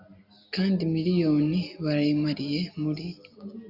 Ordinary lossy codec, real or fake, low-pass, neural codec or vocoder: AAC, 48 kbps; real; 5.4 kHz; none